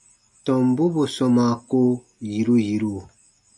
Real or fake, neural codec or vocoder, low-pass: real; none; 10.8 kHz